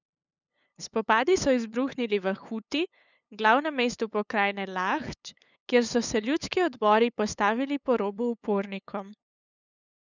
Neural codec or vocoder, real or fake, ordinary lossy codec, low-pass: codec, 16 kHz, 8 kbps, FunCodec, trained on LibriTTS, 25 frames a second; fake; none; 7.2 kHz